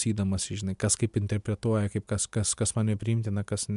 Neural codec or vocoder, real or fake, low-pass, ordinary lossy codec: none; real; 10.8 kHz; MP3, 96 kbps